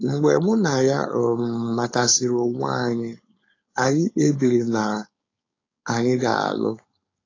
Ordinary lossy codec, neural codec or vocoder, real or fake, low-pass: AAC, 32 kbps; codec, 16 kHz, 4.8 kbps, FACodec; fake; 7.2 kHz